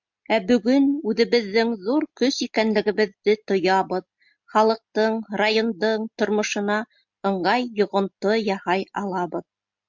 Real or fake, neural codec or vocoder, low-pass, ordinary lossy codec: real; none; 7.2 kHz; MP3, 64 kbps